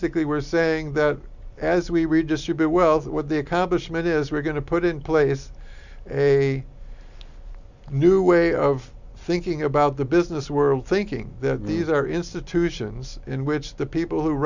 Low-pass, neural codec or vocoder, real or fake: 7.2 kHz; none; real